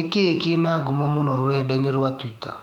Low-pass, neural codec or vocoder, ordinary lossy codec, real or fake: 19.8 kHz; autoencoder, 48 kHz, 32 numbers a frame, DAC-VAE, trained on Japanese speech; none; fake